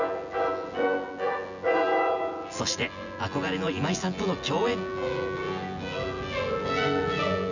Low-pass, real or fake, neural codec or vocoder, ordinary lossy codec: 7.2 kHz; fake; vocoder, 24 kHz, 100 mel bands, Vocos; none